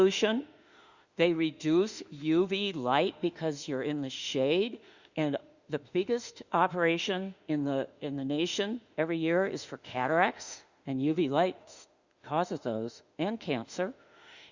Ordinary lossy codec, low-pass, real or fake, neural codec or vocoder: Opus, 64 kbps; 7.2 kHz; fake; autoencoder, 48 kHz, 32 numbers a frame, DAC-VAE, trained on Japanese speech